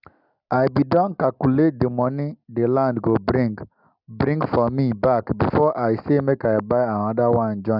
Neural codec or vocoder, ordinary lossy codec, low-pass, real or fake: none; none; 5.4 kHz; real